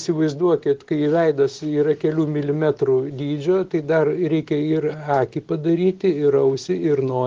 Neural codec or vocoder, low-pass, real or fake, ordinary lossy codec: none; 7.2 kHz; real; Opus, 16 kbps